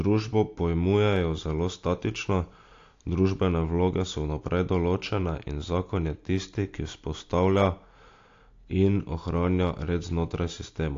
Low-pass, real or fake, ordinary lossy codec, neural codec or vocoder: 7.2 kHz; real; AAC, 48 kbps; none